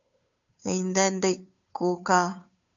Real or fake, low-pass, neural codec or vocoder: fake; 7.2 kHz; codec, 16 kHz, 2 kbps, FunCodec, trained on Chinese and English, 25 frames a second